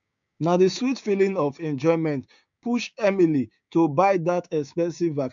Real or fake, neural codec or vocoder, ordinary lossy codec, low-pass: fake; codec, 16 kHz, 6 kbps, DAC; MP3, 64 kbps; 7.2 kHz